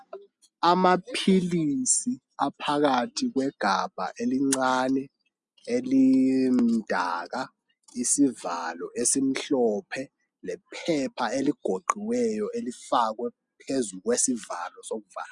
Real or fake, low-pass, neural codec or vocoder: real; 10.8 kHz; none